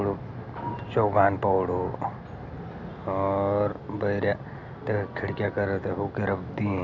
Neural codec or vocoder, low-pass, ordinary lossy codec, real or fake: none; 7.2 kHz; none; real